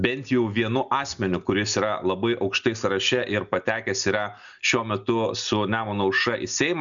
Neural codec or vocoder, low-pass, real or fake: none; 7.2 kHz; real